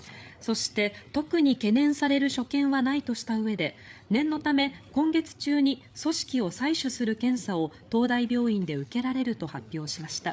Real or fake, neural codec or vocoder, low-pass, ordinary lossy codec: fake; codec, 16 kHz, 8 kbps, FreqCodec, larger model; none; none